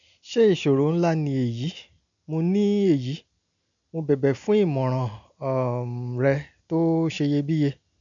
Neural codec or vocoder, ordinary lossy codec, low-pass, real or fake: none; none; 7.2 kHz; real